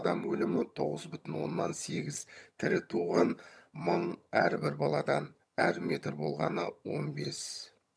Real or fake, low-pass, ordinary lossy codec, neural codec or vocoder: fake; none; none; vocoder, 22.05 kHz, 80 mel bands, HiFi-GAN